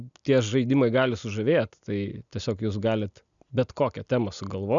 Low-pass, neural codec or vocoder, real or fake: 7.2 kHz; none; real